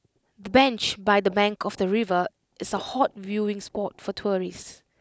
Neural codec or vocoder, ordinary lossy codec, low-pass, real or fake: none; none; none; real